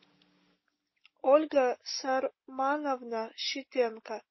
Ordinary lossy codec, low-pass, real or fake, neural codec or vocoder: MP3, 24 kbps; 7.2 kHz; real; none